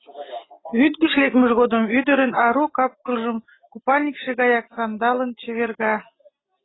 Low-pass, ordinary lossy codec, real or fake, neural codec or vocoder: 7.2 kHz; AAC, 16 kbps; real; none